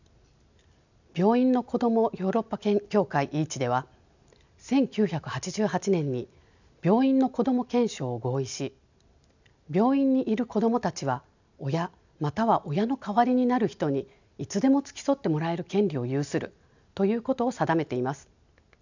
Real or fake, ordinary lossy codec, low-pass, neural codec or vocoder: real; none; 7.2 kHz; none